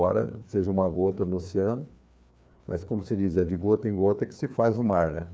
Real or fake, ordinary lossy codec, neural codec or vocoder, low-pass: fake; none; codec, 16 kHz, 2 kbps, FreqCodec, larger model; none